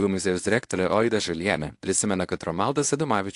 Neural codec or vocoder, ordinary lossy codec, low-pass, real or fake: codec, 24 kHz, 0.9 kbps, WavTokenizer, medium speech release version 1; AAC, 64 kbps; 10.8 kHz; fake